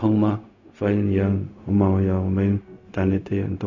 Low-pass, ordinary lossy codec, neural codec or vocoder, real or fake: 7.2 kHz; none; codec, 16 kHz, 0.4 kbps, LongCat-Audio-Codec; fake